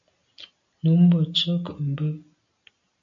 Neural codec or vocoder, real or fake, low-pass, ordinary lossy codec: none; real; 7.2 kHz; MP3, 96 kbps